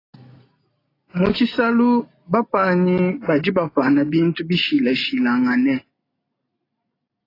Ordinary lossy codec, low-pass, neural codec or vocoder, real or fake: AAC, 24 kbps; 5.4 kHz; none; real